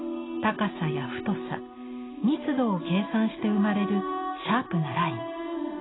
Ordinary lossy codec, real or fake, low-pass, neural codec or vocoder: AAC, 16 kbps; real; 7.2 kHz; none